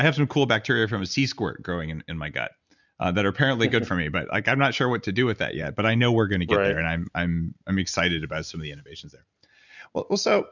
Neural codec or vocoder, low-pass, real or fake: none; 7.2 kHz; real